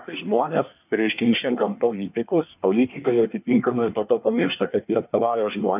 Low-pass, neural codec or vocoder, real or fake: 3.6 kHz; codec, 24 kHz, 1 kbps, SNAC; fake